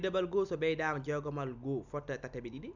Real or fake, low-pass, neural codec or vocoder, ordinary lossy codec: real; 7.2 kHz; none; none